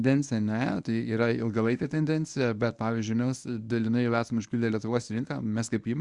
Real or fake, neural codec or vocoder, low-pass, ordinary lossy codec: fake; codec, 24 kHz, 0.9 kbps, WavTokenizer, medium speech release version 1; 10.8 kHz; Opus, 64 kbps